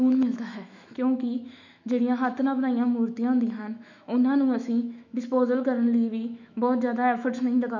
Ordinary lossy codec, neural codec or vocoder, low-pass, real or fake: none; autoencoder, 48 kHz, 128 numbers a frame, DAC-VAE, trained on Japanese speech; 7.2 kHz; fake